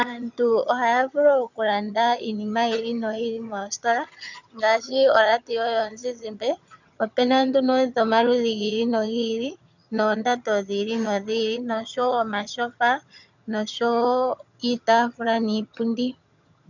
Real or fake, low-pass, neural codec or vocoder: fake; 7.2 kHz; vocoder, 22.05 kHz, 80 mel bands, HiFi-GAN